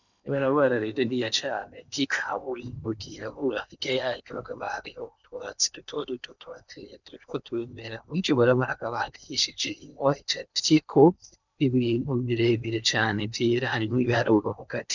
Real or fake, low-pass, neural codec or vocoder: fake; 7.2 kHz; codec, 16 kHz in and 24 kHz out, 0.8 kbps, FocalCodec, streaming, 65536 codes